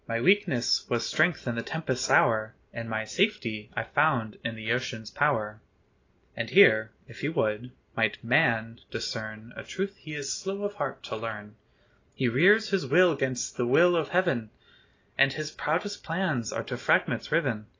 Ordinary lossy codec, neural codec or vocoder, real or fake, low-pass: AAC, 32 kbps; none; real; 7.2 kHz